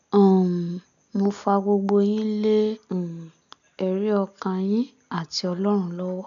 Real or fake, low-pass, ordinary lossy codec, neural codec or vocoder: real; 7.2 kHz; none; none